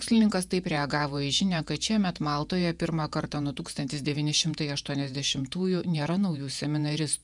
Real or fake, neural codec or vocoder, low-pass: fake; vocoder, 48 kHz, 128 mel bands, Vocos; 10.8 kHz